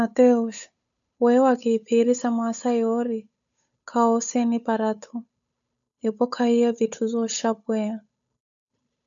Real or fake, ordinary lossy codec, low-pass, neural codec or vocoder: fake; MP3, 96 kbps; 7.2 kHz; codec, 16 kHz, 8 kbps, FunCodec, trained on Chinese and English, 25 frames a second